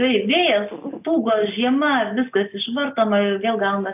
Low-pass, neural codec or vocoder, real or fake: 3.6 kHz; none; real